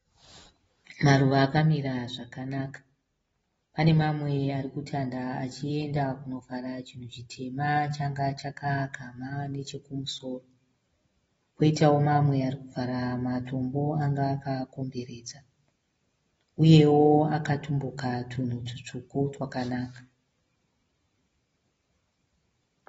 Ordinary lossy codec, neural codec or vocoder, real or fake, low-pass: AAC, 24 kbps; none; real; 9.9 kHz